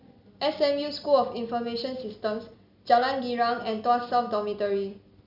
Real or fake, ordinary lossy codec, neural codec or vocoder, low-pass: real; none; none; 5.4 kHz